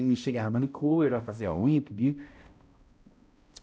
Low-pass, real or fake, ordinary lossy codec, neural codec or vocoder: none; fake; none; codec, 16 kHz, 0.5 kbps, X-Codec, HuBERT features, trained on balanced general audio